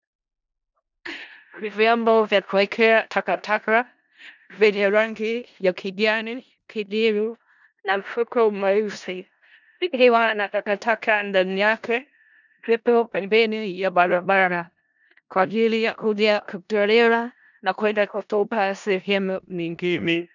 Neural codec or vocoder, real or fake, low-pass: codec, 16 kHz in and 24 kHz out, 0.4 kbps, LongCat-Audio-Codec, four codebook decoder; fake; 7.2 kHz